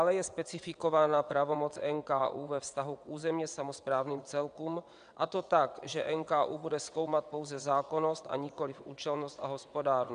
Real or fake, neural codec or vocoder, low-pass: fake; vocoder, 22.05 kHz, 80 mel bands, WaveNeXt; 9.9 kHz